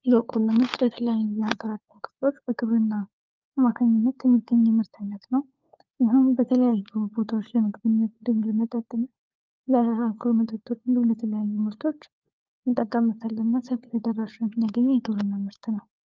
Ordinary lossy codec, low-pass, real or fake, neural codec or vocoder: Opus, 24 kbps; 7.2 kHz; fake; codec, 16 kHz, 4 kbps, FunCodec, trained on LibriTTS, 50 frames a second